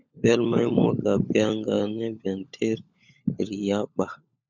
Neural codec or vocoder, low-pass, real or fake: codec, 16 kHz, 16 kbps, FunCodec, trained on LibriTTS, 50 frames a second; 7.2 kHz; fake